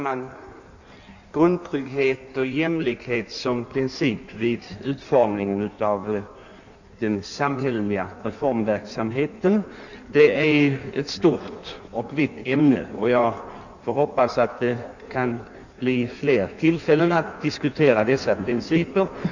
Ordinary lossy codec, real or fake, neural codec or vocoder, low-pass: none; fake; codec, 16 kHz in and 24 kHz out, 1.1 kbps, FireRedTTS-2 codec; 7.2 kHz